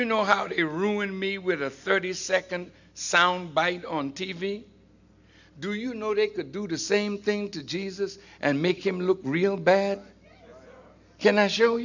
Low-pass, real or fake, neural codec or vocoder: 7.2 kHz; real; none